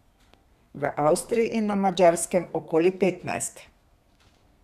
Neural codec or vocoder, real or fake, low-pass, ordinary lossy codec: codec, 32 kHz, 1.9 kbps, SNAC; fake; 14.4 kHz; none